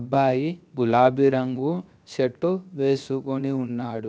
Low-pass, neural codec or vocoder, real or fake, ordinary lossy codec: none; codec, 16 kHz, 0.7 kbps, FocalCodec; fake; none